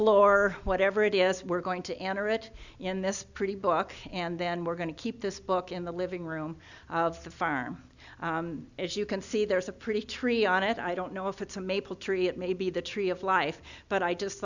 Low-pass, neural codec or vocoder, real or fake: 7.2 kHz; none; real